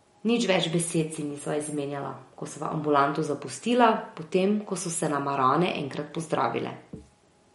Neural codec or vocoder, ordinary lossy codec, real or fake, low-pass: none; MP3, 48 kbps; real; 19.8 kHz